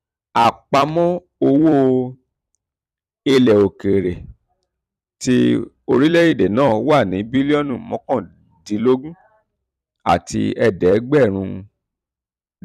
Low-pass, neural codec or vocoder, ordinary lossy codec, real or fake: 14.4 kHz; vocoder, 44.1 kHz, 128 mel bands every 256 samples, BigVGAN v2; none; fake